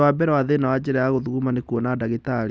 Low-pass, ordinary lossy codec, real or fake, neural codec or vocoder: none; none; real; none